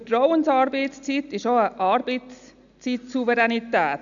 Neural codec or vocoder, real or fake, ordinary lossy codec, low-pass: none; real; none; 7.2 kHz